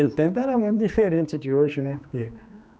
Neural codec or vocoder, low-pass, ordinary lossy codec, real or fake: codec, 16 kHz, 2 kbps, X-Codec, HuBERT features, trained on general audio; none; none; fake